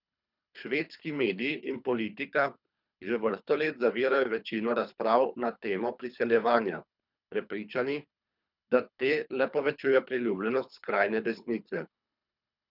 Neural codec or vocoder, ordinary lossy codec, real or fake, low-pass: codec, 24 kHz, 3 kbps, HILCodec; none; fake; 5.4 kHz